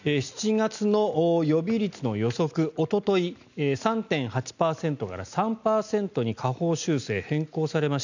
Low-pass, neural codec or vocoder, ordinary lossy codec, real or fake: 7.2 kHz; none; none; real